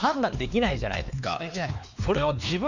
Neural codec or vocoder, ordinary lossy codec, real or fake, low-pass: codec, 16 kHz, 2 kbps, X-Codec, WavLM features, trained on Multilingual LibriSpeech; none; fake; 7.2 kHz